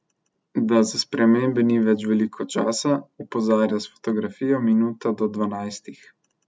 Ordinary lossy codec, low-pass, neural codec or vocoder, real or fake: none; none; none; real